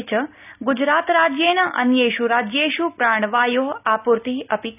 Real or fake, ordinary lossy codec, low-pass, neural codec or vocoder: real; none; 3.6 kHz; none